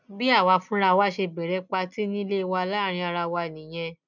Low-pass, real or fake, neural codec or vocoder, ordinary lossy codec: 7.2 kHz; real; none; none